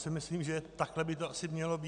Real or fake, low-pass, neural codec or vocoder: real; 9.9 kHz; none